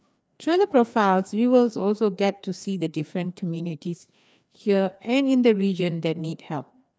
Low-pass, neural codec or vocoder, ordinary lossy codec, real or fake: none; codec, 16 kHz, 2 kbps, FreqCodec, larger model; none; fake